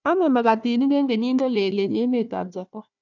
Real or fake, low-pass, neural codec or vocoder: fake; 7.2 kHz; codec, 16 kHz, 1 kbps, FunCodec, trained on Chinese and English, 50 frames a second